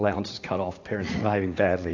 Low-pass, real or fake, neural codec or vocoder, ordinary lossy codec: 7.2 kHz; fake; vocoder, 44.1 kHz, 80 mel bands, Vocos; AAC, 48 kbps